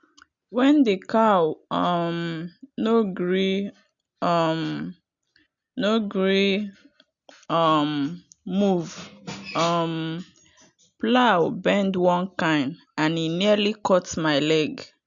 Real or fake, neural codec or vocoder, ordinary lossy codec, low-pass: real; none; none; 7.2 kHz